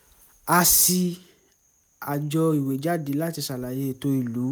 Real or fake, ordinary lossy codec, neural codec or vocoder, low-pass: real; none; none; none